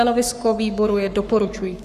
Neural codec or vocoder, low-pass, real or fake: codec, 44.1 kHz, 7.8 kbps, Pupu-Codec; 14.4 kHz; fake